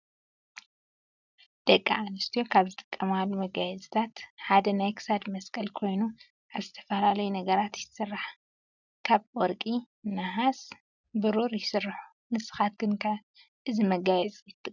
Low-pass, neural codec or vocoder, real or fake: 7.2 kHz; none; real